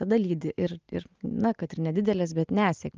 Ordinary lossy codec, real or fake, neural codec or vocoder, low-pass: Opus, 24 kbps; real; none; 7.2 kHz